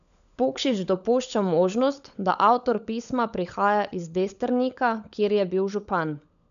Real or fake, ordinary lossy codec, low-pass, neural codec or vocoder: fake; none; 7.2 kHz; codec, 16 kHz, 16 kbps, FunCodec, trained on LibriTTS, 50 frames a second